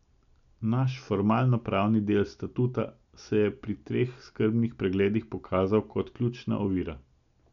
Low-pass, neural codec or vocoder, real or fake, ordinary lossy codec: 7.2 kHz; none; real; none